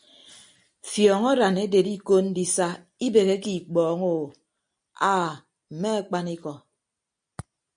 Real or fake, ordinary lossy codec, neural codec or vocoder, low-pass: real; MP3, 64 kbps; none; 9.9 kHz